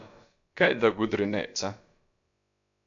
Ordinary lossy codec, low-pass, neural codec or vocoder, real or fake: AAC, 64 kbps; 7.2 kHz; codec, 16 kHz, about 1 kbps, DyCAST, with the encoder's durations; fake